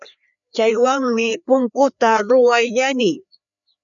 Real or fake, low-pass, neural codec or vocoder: fake; 7.2 kHz; codec, 16 kHz, 2 kbps, FreqCodec, larger model